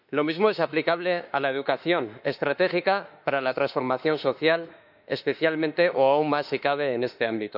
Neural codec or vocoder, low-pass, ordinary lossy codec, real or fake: autoencoder, 48 kHz, 32 numbers a frame, DAC-VAE, trained on Japanese speech; 5.4 kHz; none; fake